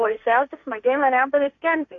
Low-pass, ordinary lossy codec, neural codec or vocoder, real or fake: 7.2 kHz; MP3, 48 kbps; codec, 16 kHz, 1.1 kbps, Voila-Tokenizer; fake